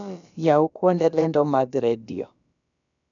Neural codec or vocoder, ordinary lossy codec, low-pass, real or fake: codec, 16 kHz, about 1 kbps, DyCAST, with the encoder's durations; none; 7.2 kHz; fake